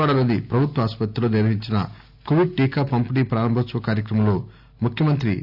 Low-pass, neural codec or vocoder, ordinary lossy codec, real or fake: 5.4 kHz; none; AAC, 48 kbps; real